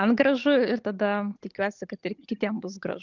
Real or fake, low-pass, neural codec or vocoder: real; 7.2 kHz; none